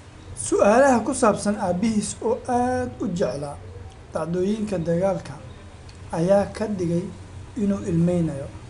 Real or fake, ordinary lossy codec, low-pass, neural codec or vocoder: real; none; 10.8 kHz; none